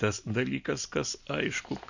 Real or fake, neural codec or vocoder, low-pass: real; none; 7.2 kHz